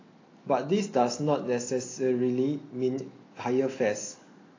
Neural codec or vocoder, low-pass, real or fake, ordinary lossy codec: none; 7.2 kHz; real; AAC, 32 kbps